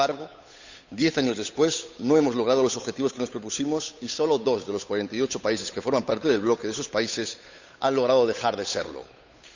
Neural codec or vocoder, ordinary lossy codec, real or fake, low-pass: codec, 16 kHz, 8 kbps, FunCodec, trained on Chinese and English, 25 frames a second; Opus, 64 kbps; fake; 7.2 kHz